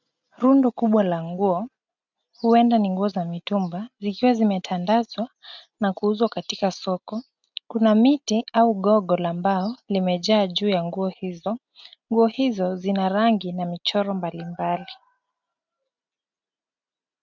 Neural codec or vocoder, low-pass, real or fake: none; 7.2 kHz; real